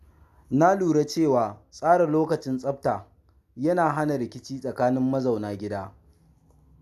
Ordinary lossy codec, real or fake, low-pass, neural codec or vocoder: none; real; 14.4 kHz; none